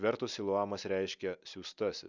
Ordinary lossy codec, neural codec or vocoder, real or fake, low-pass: Opus, 64 kbps; none; real; 7.2 kHz